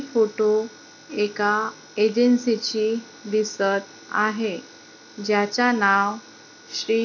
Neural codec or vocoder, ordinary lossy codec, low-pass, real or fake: none; none; 7.2 kHz; real